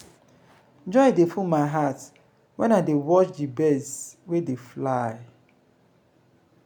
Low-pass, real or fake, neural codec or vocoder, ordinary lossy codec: none; real; none; none